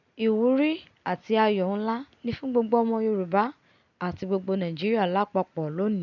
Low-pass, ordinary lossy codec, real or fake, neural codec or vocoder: 7.2 kHz; none; real; none